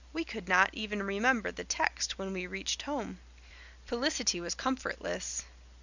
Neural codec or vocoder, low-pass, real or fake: none; 7.2 kHz; real